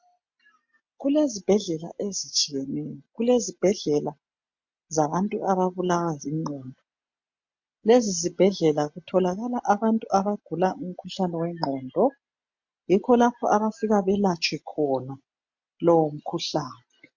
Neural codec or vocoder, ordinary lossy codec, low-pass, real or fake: none; MP3, 48 kbps; 7.2 kHz; real